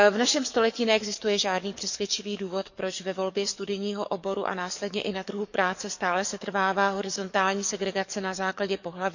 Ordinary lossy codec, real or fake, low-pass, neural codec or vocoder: none; fake; 7.2 kHz; codec, 44.1 kHz, 7.8 kbps, DAC